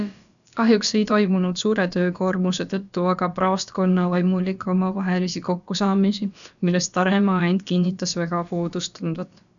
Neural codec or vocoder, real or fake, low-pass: codec, 16 kHz, about 1 kbps, DyCAST, with the encoder's durations; fake; 7.2 kHz